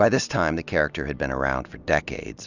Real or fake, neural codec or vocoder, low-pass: real; none; 7.2 kHz